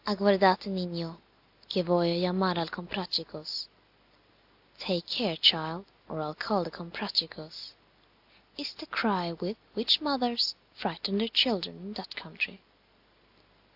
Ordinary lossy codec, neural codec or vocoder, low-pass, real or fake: AAC, 48 kbps; none; 5.4 kHz; real